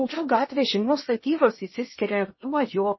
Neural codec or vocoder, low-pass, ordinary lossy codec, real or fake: codec, 16 kHz in and 24 kHz out, 0.6 kbps, FocalCodec, streaming, 2048 codes; 7.2 kHz; MP3, 24 kbps; fake